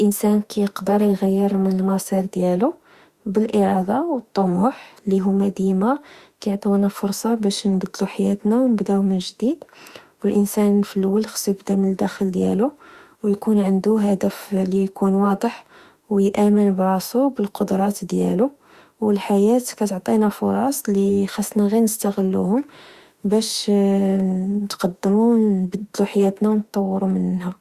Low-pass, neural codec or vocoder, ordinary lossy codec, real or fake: 14.4 kHz; autoencoder, 48 kHz, 32 numbers a frame, DAC-VAE, trained on Japanese speech; Opus, 64 kbps; fake